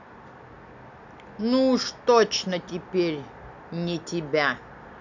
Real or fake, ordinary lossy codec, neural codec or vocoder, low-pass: real; none; none; 7.2 kHz